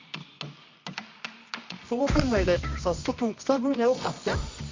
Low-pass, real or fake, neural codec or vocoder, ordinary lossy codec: 7.2 kHz; fake; codec, 24 kHz, 0.9 kbps, WavTokenizer, medium music audio release; MP3, 48 kbps